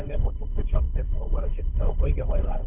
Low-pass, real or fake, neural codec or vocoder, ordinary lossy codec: 3.6 kHz; fake; codec, 16 kHz, 1.1 kbps, Voila-Tokenizer; Opus, 64 kbps